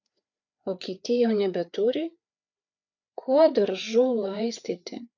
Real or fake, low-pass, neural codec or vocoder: fake; 7.2 kHz; codec, 16 kHz, 4 kbps, FreqCodec, larger model